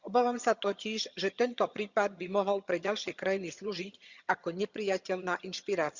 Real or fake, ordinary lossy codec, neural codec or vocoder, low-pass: fake; Opus, 64 kbps; vocoder, 22.05 kHz, 80 mel bands, HiFi-GAN; 7.2 kHz